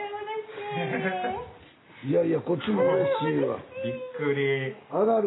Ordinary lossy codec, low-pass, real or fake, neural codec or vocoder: AAC, 16 kbps; 7.2 kHz; real; none